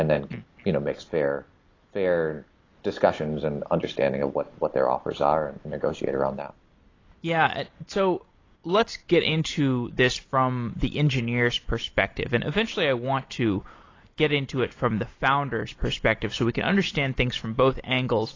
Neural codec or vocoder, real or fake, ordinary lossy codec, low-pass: none; real; AAC, 32 kbps; 7.2 kHz